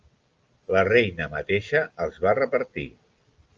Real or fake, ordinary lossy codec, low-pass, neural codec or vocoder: real; Opus, 24 kbps; 7.2 kHz; none